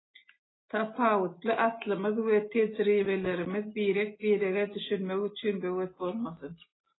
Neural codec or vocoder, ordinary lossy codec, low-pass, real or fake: none; AAC, 16 kbps; 7.2 kHz; real